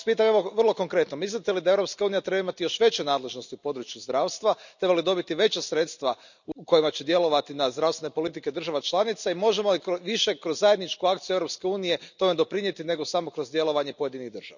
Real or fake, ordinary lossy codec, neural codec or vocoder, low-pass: real; none; none; 7.2 kHz